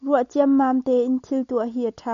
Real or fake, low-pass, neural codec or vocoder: real; 7.2 kHz; none